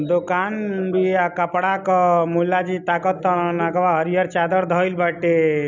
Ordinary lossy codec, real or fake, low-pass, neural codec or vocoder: none; real; 7.2 kHz; none